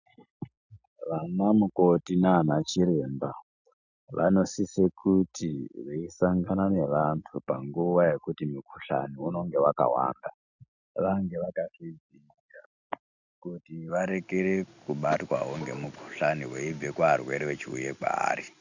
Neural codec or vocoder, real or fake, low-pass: none; real; 7.2 kHz